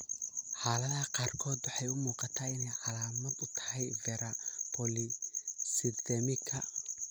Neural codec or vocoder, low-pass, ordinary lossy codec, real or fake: none; none; none; real